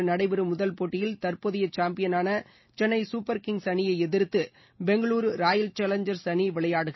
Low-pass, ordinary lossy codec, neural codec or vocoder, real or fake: 7.2 kHz; MP3, 24 kbps; none; real